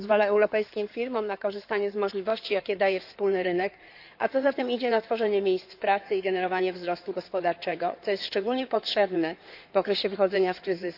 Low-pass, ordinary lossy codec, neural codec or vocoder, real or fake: 5.4 kHz; AAC, 48 kbps; codec, 16 kHz in and 24 kHz out, 2.2 kbps, FireRedTTS-2 codec; fake